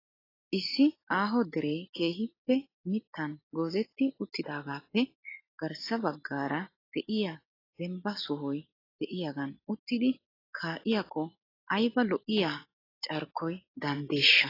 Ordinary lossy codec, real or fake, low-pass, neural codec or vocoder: AAC, 24 kbps; real; 5.4 kHz; none